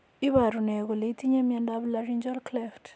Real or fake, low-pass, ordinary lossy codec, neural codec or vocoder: real; none; none; none